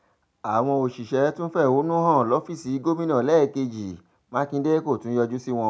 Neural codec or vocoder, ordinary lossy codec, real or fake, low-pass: none; none; real; none